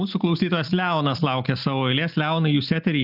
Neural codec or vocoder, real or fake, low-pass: none; real; 5.4 kHz